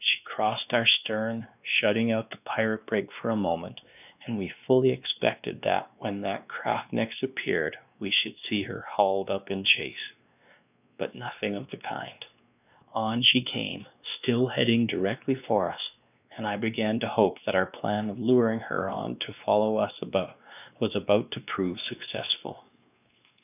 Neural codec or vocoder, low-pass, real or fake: codec, 16 kHz, 2 kbps, X-Codec, WavLM features, trained on Multilingual LibriSpeech; 3.6 kHz; fake